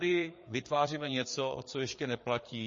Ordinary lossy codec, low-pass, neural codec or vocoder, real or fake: MP3, 32 kbps; 7.2 kHz; codec, 16 kHz, 4 kbps, FreqCodec, larger model; fake